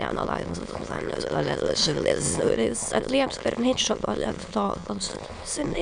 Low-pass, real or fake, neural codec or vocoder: 9.9 kHz; fake; autoencoder, 22.05 kHz, a latent of 192 numbers a frame, VITS, trained on many speakers